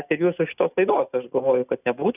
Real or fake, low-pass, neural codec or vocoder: real; 3.6 kHz; none